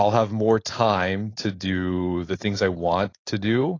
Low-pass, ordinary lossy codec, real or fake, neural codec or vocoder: 7.2 kHz; AAC, 32 kbps; fake; codec, 16 kHz, 4.8 kbps, FACodec